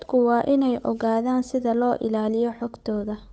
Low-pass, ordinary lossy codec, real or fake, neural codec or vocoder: none; none; fake; codec, 16 kHz, 8 kbps, FunCodec, trained on Chinese and English, 25 frames a second